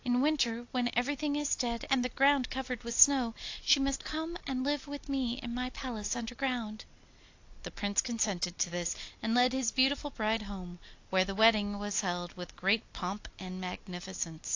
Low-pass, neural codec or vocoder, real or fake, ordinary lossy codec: 7.2 kHz; none; real; AAC, 48 kbps